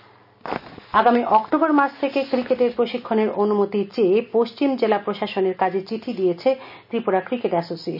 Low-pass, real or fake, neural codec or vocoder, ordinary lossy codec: 5.4 kHz; real; none; none